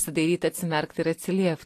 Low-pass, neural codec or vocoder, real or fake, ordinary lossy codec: 14.4 kHz; none; real; AAC, 48 kbps